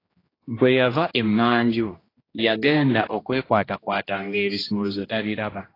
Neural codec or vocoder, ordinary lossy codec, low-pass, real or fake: codec, 16 kHz, 1 kbps, X-Codec, HuBERT features, trained on general audio; AAC, 24 kbps; 5.4 kHz; fake